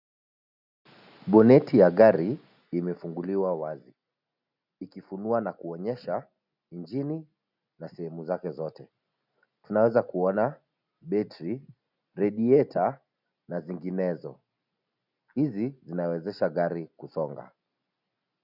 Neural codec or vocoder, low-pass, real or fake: none; 5.4 kHz; real